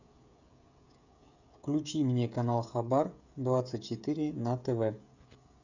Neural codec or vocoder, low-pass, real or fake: codec, 16 kHz, 16 kbps, FreqCodec, smaller model; 7.2 kHz; fake